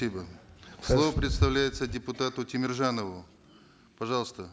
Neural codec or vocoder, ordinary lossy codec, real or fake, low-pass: none; none; real; none